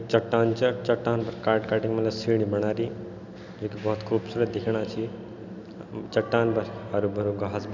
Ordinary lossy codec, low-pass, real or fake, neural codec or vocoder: none; 7.2 kHz; real; none